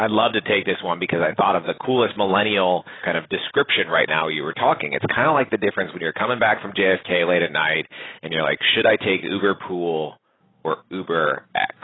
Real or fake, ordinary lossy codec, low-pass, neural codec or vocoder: real; AAC, 16 kbps; 7.2 kHz; none